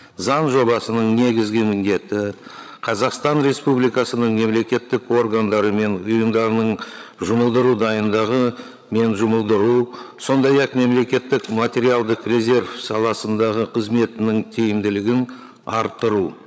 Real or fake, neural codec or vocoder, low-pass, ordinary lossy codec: fake; codec, 16 kHz, 16 kbps, FreqCodec, larger model; none; none